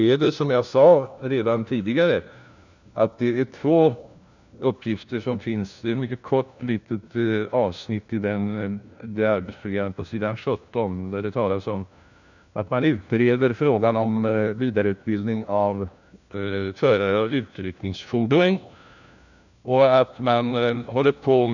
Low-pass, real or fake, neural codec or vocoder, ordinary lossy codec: 7.2 kHz; fake; codec, 16 kHz, 1 kbps, FunCodec, trained on LibriTTS, 50 frames a second; none